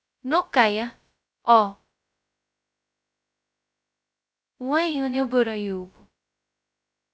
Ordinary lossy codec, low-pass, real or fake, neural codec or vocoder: none; none; fake; codec, 16 kHz, 0.2 kbps, FocalCodec